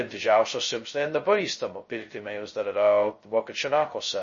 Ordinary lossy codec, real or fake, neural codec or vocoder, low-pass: MP3, 32 kbps; fake; codec, 16 kHz, 0.2 kbps, FocalCodec; 7.2 kHz